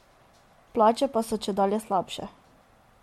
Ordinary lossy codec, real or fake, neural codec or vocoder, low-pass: MP3, 64 kbps; real; none; 19.8 kHz